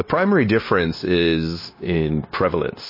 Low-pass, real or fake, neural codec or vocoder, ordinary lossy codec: 5.4 kHz; fake; codec, 16 kHz, 0.9 kbps, LongCat-Audio-Codec; MP3, 24 kbps